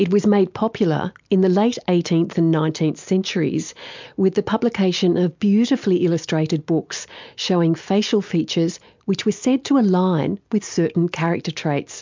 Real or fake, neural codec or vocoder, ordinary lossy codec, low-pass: real; none; MP3, 64 kbps; 7.2 kHz